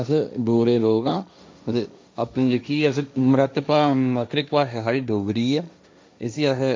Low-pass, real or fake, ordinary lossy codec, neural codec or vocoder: none; fake; none; codec, 16 kHz, 1.1 kbps, Voila-Tokenizer